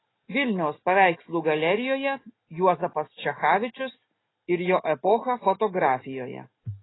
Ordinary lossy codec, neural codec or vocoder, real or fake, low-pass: AAC, 16 kbps; none; real; 7.2 kHz